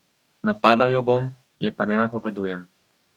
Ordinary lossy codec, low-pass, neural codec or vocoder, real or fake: none; 19.8 kHz; codec, 44.1 kHz, 2.6 kbps, DAC; fake